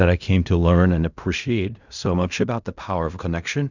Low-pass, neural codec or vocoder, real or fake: 7.2 kHz; codec, 16 kHz in and 24 kHz out, 0.4 kbps, LongCat-Audio-Codec, fine tuned four codebook decoder; fake